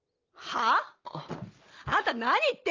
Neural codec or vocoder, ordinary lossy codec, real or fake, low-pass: none; Opus, 24 kbps; real; 7.2 kHz